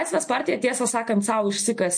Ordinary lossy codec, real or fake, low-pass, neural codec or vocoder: MP3, 48 kbps; real; 9.9 kHz; none